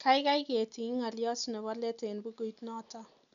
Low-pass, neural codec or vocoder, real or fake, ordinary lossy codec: 7.2 kHz; none; real; none